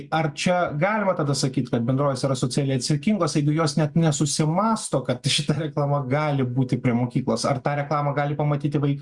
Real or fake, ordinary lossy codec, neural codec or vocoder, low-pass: real; Opus, 64 kbps; none; 10.8 kHz